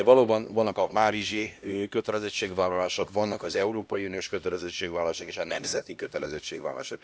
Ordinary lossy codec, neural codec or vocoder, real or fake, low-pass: none; codec, 16 kHz, 1 kbps, X-Codec, HuBERT features, trained on LibriSpeech; fake; none